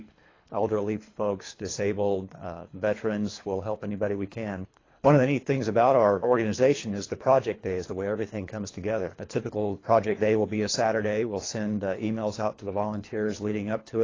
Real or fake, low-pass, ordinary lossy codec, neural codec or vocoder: fake; 7.2 kHz; AAC, 32 kbps; codec, 24 kHz, 3 kbps, HILCodec